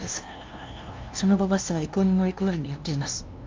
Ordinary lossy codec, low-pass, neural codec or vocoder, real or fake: Opus, 24 kbps; 7.2 kHz; codec, 16 kHz, 0.5 kbps, FunCodec, trained on LibriTTS, 25 frames a second; fake